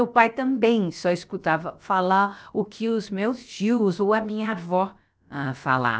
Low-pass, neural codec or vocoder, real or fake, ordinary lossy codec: none; codec, 16 kHz, about 1 kbps, DyCAST, with the encoder's durations; fake; none